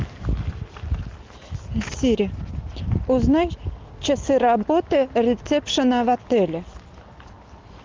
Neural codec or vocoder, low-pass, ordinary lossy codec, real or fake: none; 7.2 kHz; Opus, 32 kbps; real